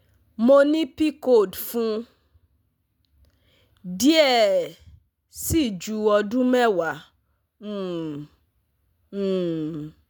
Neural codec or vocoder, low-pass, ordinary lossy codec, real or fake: none; none; none; real